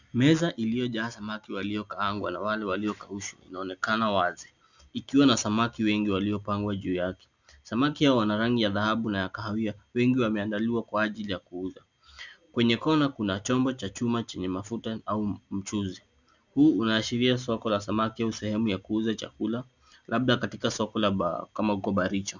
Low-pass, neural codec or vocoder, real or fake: 7.2 kHz; none; real